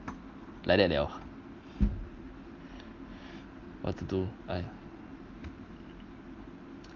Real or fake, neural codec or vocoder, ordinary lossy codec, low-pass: real; none; Opus, 24 kbps; 7.2 kHz